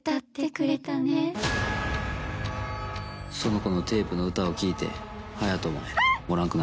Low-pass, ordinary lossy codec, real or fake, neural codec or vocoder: none; none; real; none